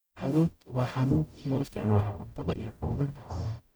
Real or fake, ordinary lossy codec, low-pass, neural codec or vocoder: fake; none; none; codec, 44.1 kHz, 0.9 kbps, DAC